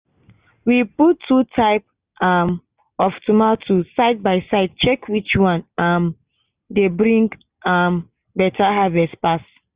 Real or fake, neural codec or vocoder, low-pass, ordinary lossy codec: real; none; 3.6 kHz; Opus, 64 kbps